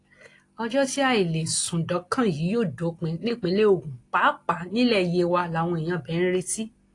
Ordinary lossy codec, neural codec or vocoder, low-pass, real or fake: AAC, 48 kbps; none; 10.8 kHz; real